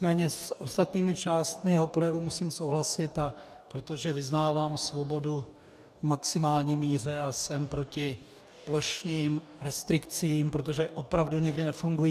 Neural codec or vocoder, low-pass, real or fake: codec, 44.1 kHz, 2.6 kbps, DAC; 14.4 kHz; fake